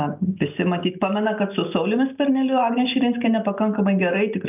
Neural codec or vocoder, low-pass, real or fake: none; 3.6 kHz; real